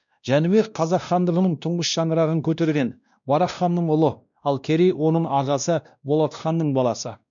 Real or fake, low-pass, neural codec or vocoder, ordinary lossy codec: fake; 7.2 kHz; codec, 16 kHz, 1 kbps, X-Codec, WavLM features, trained on Multilingual LibriSpeech; none